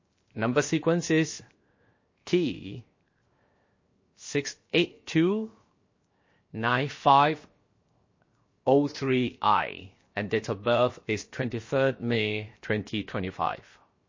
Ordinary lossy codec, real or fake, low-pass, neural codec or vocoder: MP3, 32 kbps; fake; 7.2 kHz; codec, 16 kHz, 0.7 kbps, FocalCodec